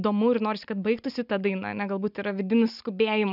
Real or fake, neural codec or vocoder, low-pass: real; none; 5.4 kHz